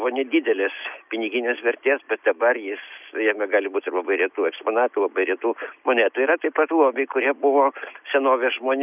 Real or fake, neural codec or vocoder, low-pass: real; none; 3.6 kHz